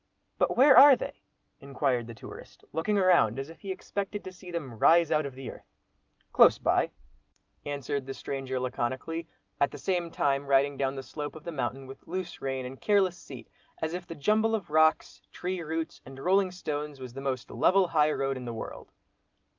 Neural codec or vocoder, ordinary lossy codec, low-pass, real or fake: none; Opus, 24 kbps; 7.2 kHz; real